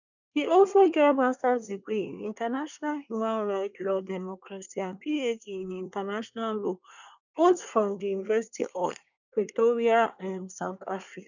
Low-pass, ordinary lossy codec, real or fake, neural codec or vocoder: 7.2 kHz; none; fake; codec, 24 kHz, 1 kbps, SNAC